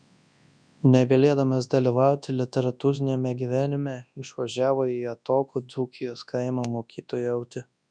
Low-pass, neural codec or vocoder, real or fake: 9.9 kHz; codec, 24 kHz, 0.9 kbps, WavTokenizer, large speech release; fake